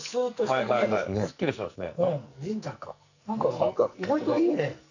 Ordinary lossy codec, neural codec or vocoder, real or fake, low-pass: none; codec, 44.1 kHz, 2.6 kbps, SNAC; fake; 7.2 kHz